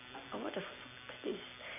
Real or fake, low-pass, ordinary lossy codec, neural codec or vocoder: real; 3.6 kHz; none; none